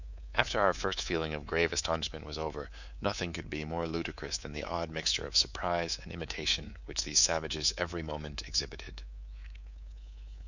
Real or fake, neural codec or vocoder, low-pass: fake; codec, 24 kHz, 3.1 kbps, DualCodec; 7.2 kHz